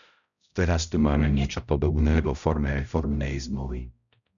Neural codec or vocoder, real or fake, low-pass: codec, 16 kHz, 0.5 kbps, X-Codec, HuBERT features, trained on balanced general audio; fake; 7.2 kHz